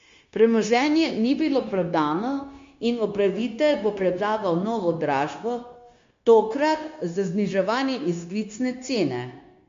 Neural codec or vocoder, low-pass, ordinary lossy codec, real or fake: codec, 16 kHz, 0.9 kbps, LongCat-Audio-Codec; 7.2 kHz; MP3, 48 kbps; fake